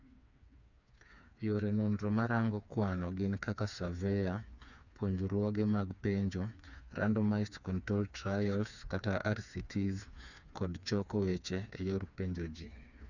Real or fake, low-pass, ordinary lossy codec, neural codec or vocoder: fake; 7.2 kHz; none; codec, 16 kHz, 4 kbps, FreqCodec, smaller model